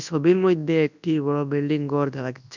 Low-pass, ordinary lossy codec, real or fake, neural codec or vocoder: 7.2 kHz; none; fake; codec, 24 kHz, 0.9 kbps, WavTokenizer, large speech release